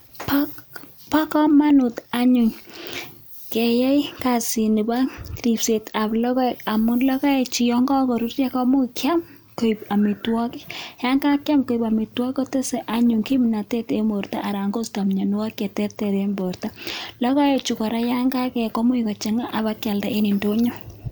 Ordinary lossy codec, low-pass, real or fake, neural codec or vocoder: none; none; real; none